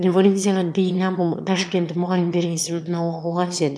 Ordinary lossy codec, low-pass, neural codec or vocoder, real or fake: none; none; autoencoder, 22.05 kHz, a latent of 192 numbers a frame, VITS, trained on one speaker; fake